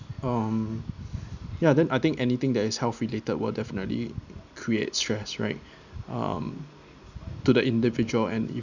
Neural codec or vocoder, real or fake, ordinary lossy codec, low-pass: none; real; none; 7.2 kHz